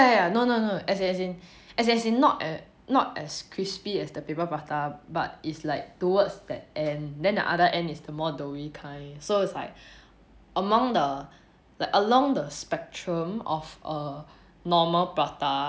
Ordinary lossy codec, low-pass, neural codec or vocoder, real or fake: none; none; none; real